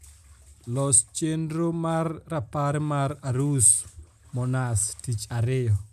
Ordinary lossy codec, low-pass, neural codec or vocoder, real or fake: none; 14.4 kHz; none; real